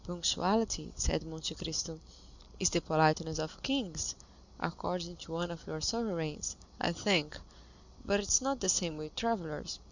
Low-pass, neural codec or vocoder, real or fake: 7.2 kHz; none; real